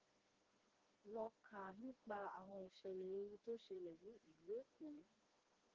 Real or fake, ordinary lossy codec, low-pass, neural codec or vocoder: fake; Opus, 16 kbps; 7.2 kHz; codec, 16 kHz, 2 kbps, FreqCodec, smaller model